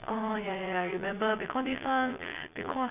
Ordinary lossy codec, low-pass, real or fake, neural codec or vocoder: none; 3.6 kHz; fake; vocoder, 22.05 kHz, 80 mel bands, Vocos